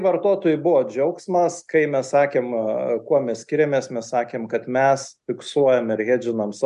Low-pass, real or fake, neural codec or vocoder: 10.8 kHz; real; none